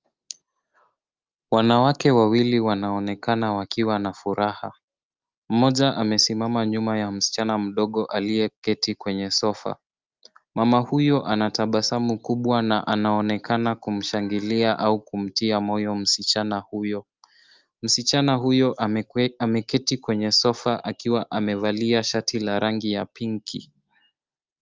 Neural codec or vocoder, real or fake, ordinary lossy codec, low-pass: none; real; Opus, 32 kbps; 7.2 kHz